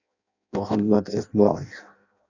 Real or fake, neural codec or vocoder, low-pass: fake; codec, 16 kHz in and 24 kHz out, 0.6 kbps, FireRedTTS-2 codec; 7.2 kHz